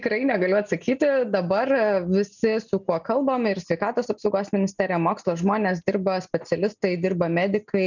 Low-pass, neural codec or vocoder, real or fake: 7.2 kHz; none; real